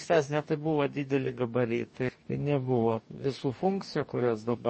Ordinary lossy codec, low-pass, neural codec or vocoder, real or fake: MP3, 32 kbps; 10.8 kHz; codec, 44.1 kHz, 2.6 kbps, DAC; fake